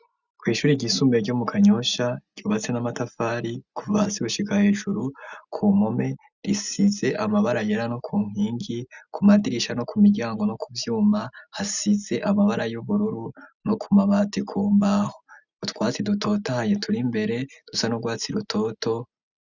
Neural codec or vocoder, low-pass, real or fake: none; 7.2 kHz; real